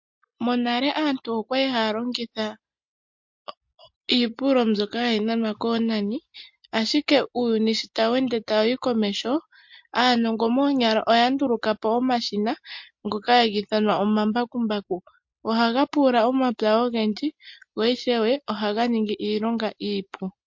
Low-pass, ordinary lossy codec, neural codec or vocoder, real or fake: 7.2 kHz; MP3, 48 kbps; none; real